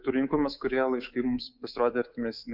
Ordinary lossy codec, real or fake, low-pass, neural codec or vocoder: Opus, 64 kbps; fake; 5.4 kHz; codec, 24 kHz, 3.1 kbps, DualCodec